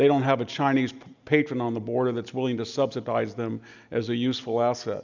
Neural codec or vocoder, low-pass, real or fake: none; 7.2 kHz; real